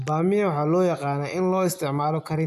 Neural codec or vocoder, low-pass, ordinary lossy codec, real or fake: none; 14.4 kHz; none; real